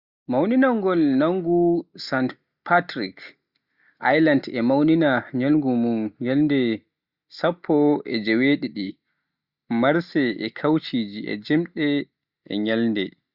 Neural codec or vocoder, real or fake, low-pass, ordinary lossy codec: none; real; 5.4 kHz; Opus, 64 kbps